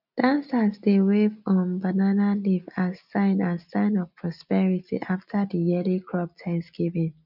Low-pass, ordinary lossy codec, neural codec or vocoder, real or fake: 5.4 kHz; none; none; real